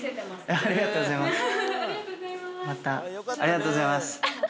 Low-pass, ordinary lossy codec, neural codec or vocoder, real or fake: none; none; none; real